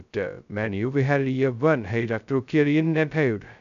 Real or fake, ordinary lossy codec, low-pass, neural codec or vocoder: fake; none; 7.2 kHz; codec, 16 kHz, 0.2 kbps, FocalCodec